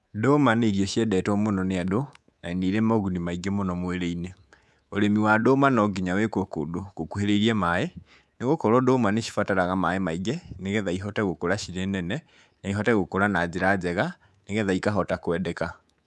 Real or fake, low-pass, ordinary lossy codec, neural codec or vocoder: fake; none; none; codec, 24 kHz, 3.1 kbps, DualCodec